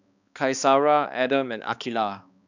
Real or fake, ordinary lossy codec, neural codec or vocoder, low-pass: fake; none; codec, 16 kHz, 2 kbps, X-Codec, HuBERT features, trained on balanced general audio; 7.2 kHz